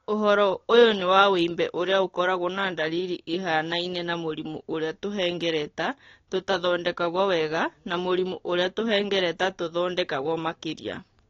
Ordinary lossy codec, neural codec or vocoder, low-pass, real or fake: AAC, 32 kbps; codec, 16 kHz, 6 kbps, DAC; 7.2 kHz; fake